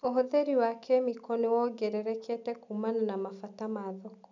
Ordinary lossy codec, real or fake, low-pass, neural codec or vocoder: none; real; 7.2 kHz; none